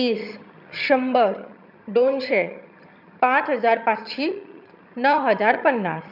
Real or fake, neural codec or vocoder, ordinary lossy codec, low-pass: fake; vocoder, 22.05 kHz, 80 mel bands, HiFi-GAN; none; 5.4 kHz